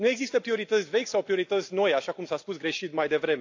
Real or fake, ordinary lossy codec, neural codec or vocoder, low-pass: real; AAC, 48 kbps; none; 7.2 kHz